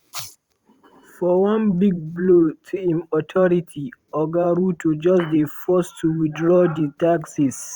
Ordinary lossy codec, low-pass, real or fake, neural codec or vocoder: Opus, 64 kbps; 19.8 kHz; fake; vocoder, 44.1 kHz, 128 mel bands every 512 samples, BigVGAN v2